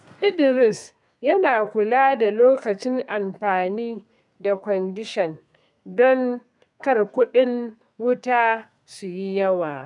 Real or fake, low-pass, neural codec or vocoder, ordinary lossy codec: fake; 10.8 kHz; codec, 32 kHz, 1.9 kbps, SNAC; none